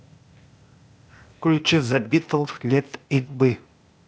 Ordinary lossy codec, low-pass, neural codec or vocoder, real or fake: none; none; codec, 16 kHz, 0.8 kbps, ZipCodec; fake